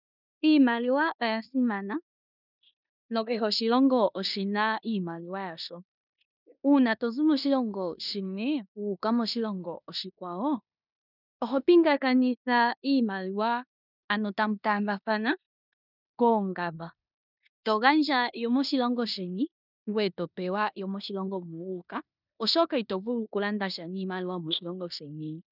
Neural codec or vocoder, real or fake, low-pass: codec, 16 kHz in and 24 kHz out, 0.9 kbps, LongCat-Audio-Codec, four codebook decoder; fake; 5.4 kHz